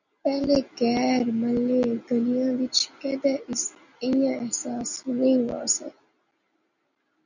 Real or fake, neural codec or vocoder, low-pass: real; none; 7.2 kHz